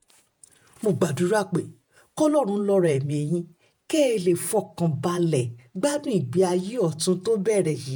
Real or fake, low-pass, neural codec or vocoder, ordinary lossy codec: fake; none; vocoder, 48 kHz, 128 mel bands, Vocos; none